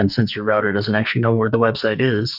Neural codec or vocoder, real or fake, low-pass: codec, 44.1 kHz, 2.6 kbps, SNAC; fake; 5.4 kHz